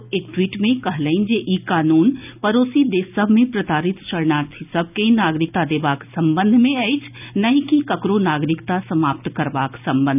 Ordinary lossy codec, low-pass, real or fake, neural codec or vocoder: none; 3.6 kHz; real; none